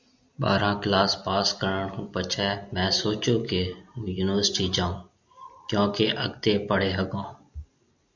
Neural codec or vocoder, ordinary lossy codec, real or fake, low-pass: none; MP3, 64 kbps; real; 7.2 kHz